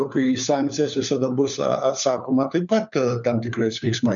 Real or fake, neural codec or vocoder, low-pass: fake; codec, 16 kHz, 4 kbps, FunCodec, trained on LibriTTS, 50 frames a second; 7.2 kHz